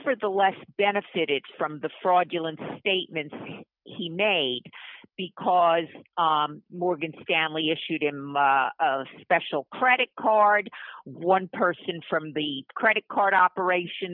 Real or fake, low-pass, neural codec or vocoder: real; 5.4 kHz; none